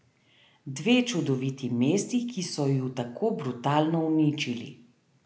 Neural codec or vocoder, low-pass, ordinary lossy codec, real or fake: none; none; none; real